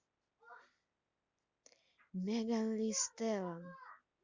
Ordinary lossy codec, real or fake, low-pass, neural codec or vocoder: Opus, 64 kbps; real; 7.2 kHz; none